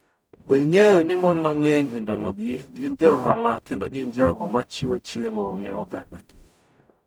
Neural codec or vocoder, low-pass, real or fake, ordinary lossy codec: codec, 44.1 kHz, 0.9 kbps, DAC; none; fake; none